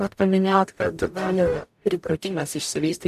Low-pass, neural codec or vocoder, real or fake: 14.4 kHz; codec, 44.1 kHz, 0.9 kbps, DAC; fake